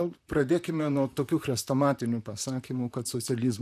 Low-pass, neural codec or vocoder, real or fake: 14.4 kHz; vocoder, 44.1 kHz, 128 mel bands, Pupu-Vocoder; fake